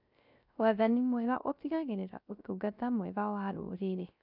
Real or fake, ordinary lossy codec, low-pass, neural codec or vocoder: fake; none; 5.4 kHz; codec, 16 kHz, 0.3 kbps, FocalCodec